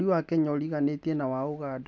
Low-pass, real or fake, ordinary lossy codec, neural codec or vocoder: none; real; none; none